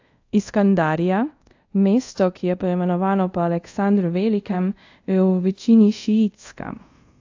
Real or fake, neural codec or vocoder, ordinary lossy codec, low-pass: fake; codec, 24 kHz, 0.5 kbps, DualCodec; AAC, 48 kbps; 7.2 kHz